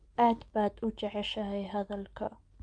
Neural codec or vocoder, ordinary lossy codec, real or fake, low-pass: none; Opus, 32 kbps; real; 9.9 kHz